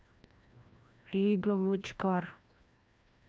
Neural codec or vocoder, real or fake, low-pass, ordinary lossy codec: codec, 16 kHz, 1 kbps, FreqCodec, larger model; fake; none; none